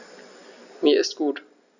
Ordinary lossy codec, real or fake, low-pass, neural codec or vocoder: none; real; 7.2 kHz; none